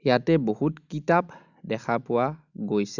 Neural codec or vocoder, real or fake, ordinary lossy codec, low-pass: none; real; none; 7.2 kHz